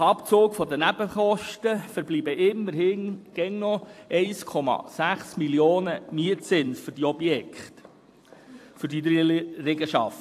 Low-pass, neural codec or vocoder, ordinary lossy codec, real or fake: 14.4 kHz; vocoder, 44.1 kHz, 128 mel bands every 256 samples, BigVGAN v2; AAC, 64 kbps; fake